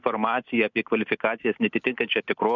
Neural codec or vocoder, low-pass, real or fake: none; 7.2 kHz; real